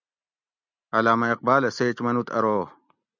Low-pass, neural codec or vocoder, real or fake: 7.2 kHz; none; real